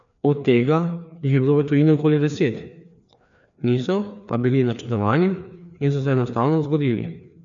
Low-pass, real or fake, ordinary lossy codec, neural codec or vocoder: 7.2 kHz; fake; none; codec, 16 kHz, 2 kbps, FreqCodec, larger model